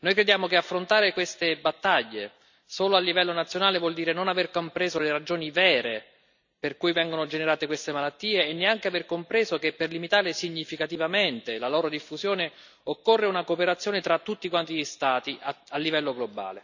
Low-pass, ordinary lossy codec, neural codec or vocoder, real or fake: 7.2 kHz; none; none; real